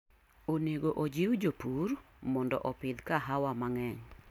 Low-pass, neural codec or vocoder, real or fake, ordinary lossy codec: 19.8 kHz; none; real; none